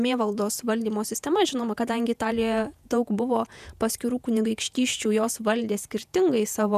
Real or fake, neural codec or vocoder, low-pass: fake; vocoder, 48 kHz, 128 mel bands, Vocos; 14.4 kHz